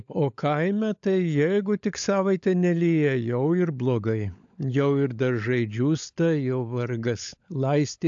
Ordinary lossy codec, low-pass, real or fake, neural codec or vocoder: AAC, 64 kbps; 7.2 kHz; fake; codec, 16 kHz, 16 kbps, FunCodec, trained on LibriTTS, 50 frames a second